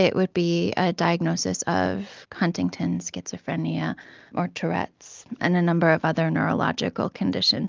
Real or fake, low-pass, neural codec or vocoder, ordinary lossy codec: real; 7.2 kHz; none; Opus, 24 kbps